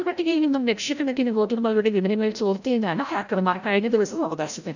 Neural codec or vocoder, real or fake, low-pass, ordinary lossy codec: codec, 16 kHz, 0.5 kbps, FreqCodec, larger model; fake; 7.2 kHz; none